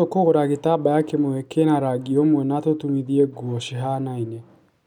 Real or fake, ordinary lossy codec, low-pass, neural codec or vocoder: real; none; 19.8 kHz; none